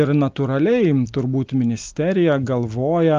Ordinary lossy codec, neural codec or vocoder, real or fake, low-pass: Opus, 24 kbps; none; real; 7.2 kHz